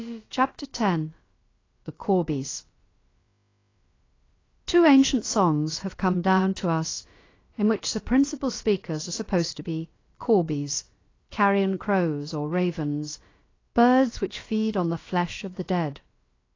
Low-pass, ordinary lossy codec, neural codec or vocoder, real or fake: 7.2 kHz; AAC, 32 kbps; codec, 16 kHz, about 1 kbps, DyCAST, with the encoder's durations; fake